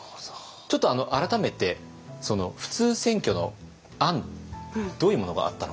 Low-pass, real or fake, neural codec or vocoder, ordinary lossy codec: none; real; none; none